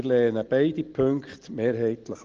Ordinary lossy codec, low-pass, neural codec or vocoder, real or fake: Opus, 16 kbps; 7.2 kHz; none; real